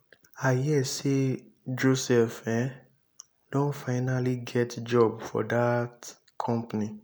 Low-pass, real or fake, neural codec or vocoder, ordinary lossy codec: none; real; none; none